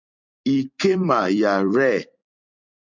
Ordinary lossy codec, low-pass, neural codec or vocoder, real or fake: MP3, 64 kbps; 7.2 kHz; vocoder, 44.1 kHz, 128 mel bands every 256 samples, BigVGAN v2; fake